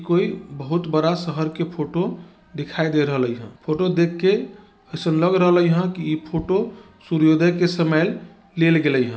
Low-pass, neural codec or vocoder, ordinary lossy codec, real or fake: none; none; none; real